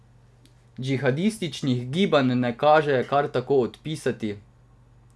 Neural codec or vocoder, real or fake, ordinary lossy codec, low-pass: none; real; none; none